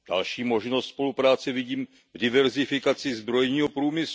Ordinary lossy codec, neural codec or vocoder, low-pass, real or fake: none; none; none; real